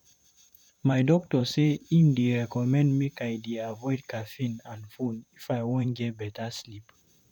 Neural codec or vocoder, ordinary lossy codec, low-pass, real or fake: vocoder, 44.1 kHz, 128 mel bands, Pupu-Vocoder; none; 19.8 kHz; fake